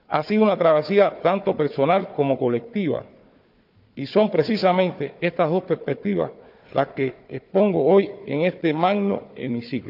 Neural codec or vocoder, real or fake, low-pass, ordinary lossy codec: codec, 16 kHz, 4 kbps, FunCodec, trained on Chinese and English, 50 frames a second; fake; 5.4 kHz; none